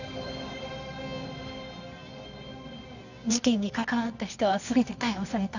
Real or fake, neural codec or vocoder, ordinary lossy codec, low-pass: fake; codec, 24 kHz, 0.9 kbps, WavTokenizer, medium music audio release; none; 7.2 kHz